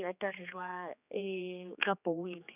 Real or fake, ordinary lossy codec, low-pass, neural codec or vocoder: fake; none; 3.6 kHz; codec, 16 kHz, 2 kbps, X-Codec, HuBERT features, trained on general audio